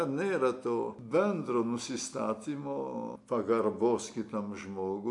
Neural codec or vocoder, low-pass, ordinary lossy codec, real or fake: none; 10.8 kHz; MP3, 64 kbps; real